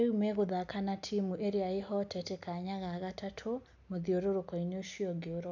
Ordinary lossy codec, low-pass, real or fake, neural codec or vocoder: none; 7.2 kHz; real; none